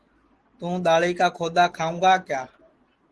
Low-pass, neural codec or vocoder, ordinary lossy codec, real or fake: 10.8 kHz; none; Opus, 16 kbps; real